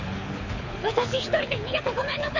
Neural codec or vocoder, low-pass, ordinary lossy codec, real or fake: codec, 24 kHz, 6 kbps, HILCodec; 7.2 kHz; AAC, 48 kbps; fake